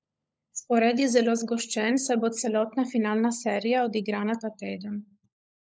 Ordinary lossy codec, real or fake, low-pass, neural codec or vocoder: none; fake; none; codec, 16 kHz, 16 kbps, FunCodec, trained on LibriTTS, 50 frames a second